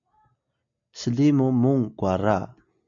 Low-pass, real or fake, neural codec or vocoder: 7.2 kHz; real; none